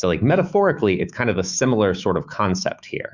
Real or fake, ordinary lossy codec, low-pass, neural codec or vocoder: fake; Opus, 64 kbps; 7.2 kHz; autoencoder, 48 kHz, 128 numbers a frame, DAC-VAE, trained on Japanese speech